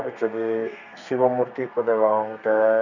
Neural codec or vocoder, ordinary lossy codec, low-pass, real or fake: codec, 32 kHz, 1.9 kbps, SNAC; none; 7.2 kHz; fake